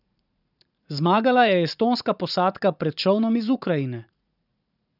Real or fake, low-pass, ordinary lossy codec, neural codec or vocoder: fake; 5.4 kHz; none; vocoder, 44.1 kHz, 80 mel bands, Vocos